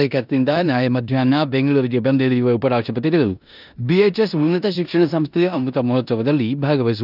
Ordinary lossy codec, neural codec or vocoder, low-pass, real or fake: none; codec, 16 kHz in and 24 kHz out, 0.9 kbps, LongCat-Audio-Codec, four codebook decoder; 5.4 kHz; fake